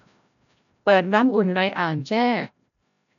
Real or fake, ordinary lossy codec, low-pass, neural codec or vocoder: fake; none; 7.2 kHz; codec, 16 kHz, 0.5 kbps, FreqCodec, larger model